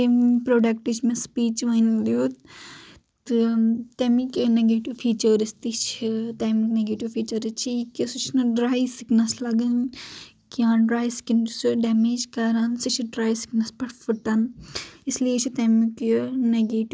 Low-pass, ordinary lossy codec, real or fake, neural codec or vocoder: none; none; real; none